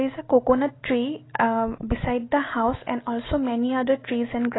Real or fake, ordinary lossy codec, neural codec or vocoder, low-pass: real; AAC, 16 kbps; none; 7.2 kHz